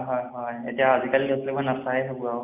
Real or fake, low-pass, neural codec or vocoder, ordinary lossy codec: real; 3.6 kHz; none; none